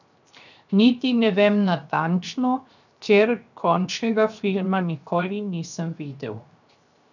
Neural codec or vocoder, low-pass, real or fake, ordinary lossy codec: codec, 16 kHz, 0.7 kbps, FocalCodec; 7.2 kHz; fake; none